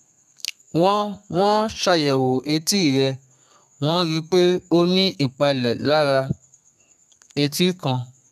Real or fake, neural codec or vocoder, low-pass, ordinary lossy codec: fake; codec, 32 kHz, 1.9 kbps, SNAC; 14.4 kHz; none